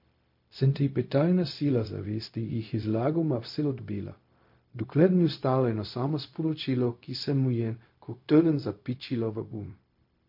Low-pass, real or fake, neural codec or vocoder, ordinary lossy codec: 5.4 kHz; fake; codec, 16 kHz, 0.4 kbps, LongCat-Audio-Codec; MP3, 32 kbps